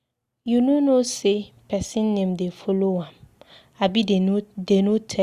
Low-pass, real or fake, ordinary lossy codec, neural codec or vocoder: 14.4 kHz; real; Opus, 64 kbps; none